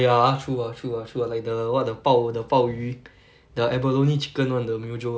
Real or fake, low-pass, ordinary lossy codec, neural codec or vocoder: real; none; none; none